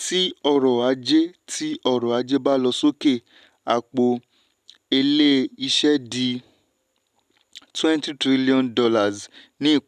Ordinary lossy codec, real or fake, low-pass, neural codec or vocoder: none; real; 14.4 kHz; none